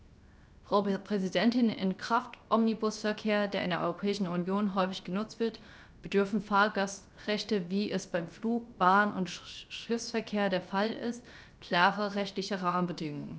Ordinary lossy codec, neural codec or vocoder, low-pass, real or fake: none; codec, 16 kHz, 0.7 kbps, FocalCodec; none; fake